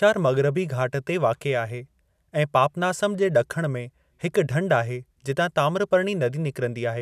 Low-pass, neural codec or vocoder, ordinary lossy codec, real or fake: 14.4 kHz; none; none; real